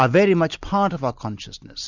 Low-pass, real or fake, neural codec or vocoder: 7.2 kHz; real; none